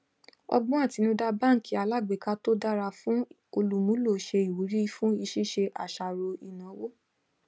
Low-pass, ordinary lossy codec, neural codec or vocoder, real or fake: none; none; none; real